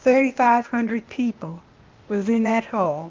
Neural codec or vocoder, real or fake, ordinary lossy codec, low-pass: codec, 16 kHz, 0.8 kbps, ZipCodec; fake; Opus, 24 kbps; 7.2 kHz